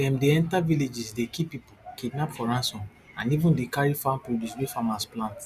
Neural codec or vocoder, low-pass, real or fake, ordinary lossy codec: none; 14.4 kHz; real; none